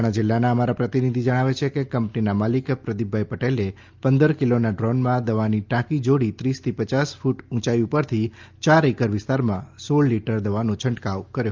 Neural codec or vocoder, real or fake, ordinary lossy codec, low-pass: none; real; Opus, 24 kbps; 7.2 kHz